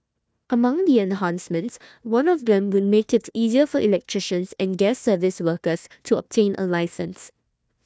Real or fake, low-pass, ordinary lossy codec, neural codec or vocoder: fake; none; none; codec, 16 kHz, 1 kbps, FunCodec, trained on Chinese and English, 50 frames a second